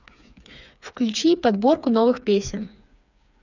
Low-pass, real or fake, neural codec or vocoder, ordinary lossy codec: 7.2 kHz; fake; codec, 16 kHz, 4 kbps, FreqCodec, smaller model; none